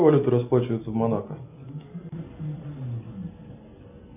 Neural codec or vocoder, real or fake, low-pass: none; real; 3.6 kHz